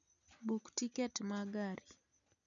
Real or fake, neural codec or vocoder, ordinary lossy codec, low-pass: real; none; none; 7.2 kHz